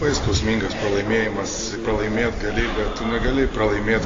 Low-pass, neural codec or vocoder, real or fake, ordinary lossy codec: 7.2 kHz; none; real; AAC, 32 kbps